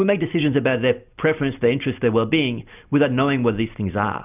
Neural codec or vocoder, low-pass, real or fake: none; 3.6 kHz; real